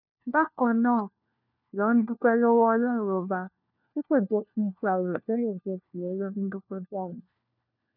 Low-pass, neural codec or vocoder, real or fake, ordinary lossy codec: 5.4 kHz; codec, 16 kHz, 1 kbps, FunCodec, trained on LibriTTS, 50 frames a second; fake; none